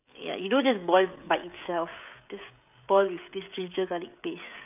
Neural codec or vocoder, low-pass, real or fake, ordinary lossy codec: codec, 16 kHz, 16 kbps, FreqCodec, smaller model; 3.6 kHz; fake; none